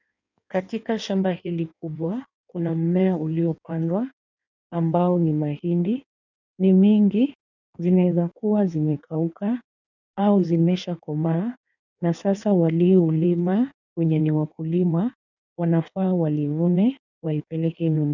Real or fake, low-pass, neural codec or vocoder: fake; 7.2 kHz; codec, 16 kHz in and 24 kHz out, 1.1 kbps, FireRedTTS-2 codec